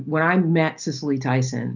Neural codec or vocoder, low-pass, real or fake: none; 7.2 kHz; real